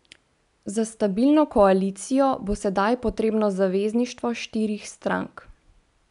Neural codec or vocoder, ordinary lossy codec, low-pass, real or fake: none; none; 10.8 kHz; real